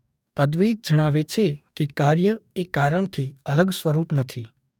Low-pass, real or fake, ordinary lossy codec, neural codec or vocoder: 19.8 kHz; fake; none; codec, 44.1 kHz, 2.6 kbps, DAC